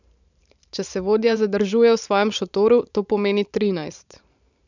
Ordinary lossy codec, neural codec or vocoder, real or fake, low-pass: none; vocoder, 44.1 kHz, 128 mel bands every 512 samples, BigVGAN v2; fake; 7.2 kHz